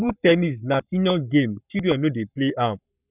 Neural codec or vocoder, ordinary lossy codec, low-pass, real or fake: codec, 44.1 kHz, 7.8 kbps, Pupu-Codec; none; 3.6 kHz; fake